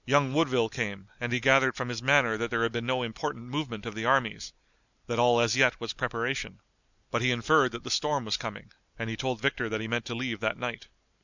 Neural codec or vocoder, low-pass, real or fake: none; 7.2 kHz; real